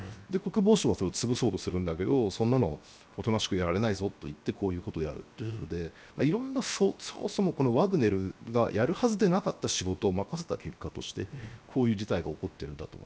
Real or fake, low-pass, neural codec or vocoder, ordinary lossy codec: fake; none; codec, 16 kHz, 0.7 kbps, FocalCodec; none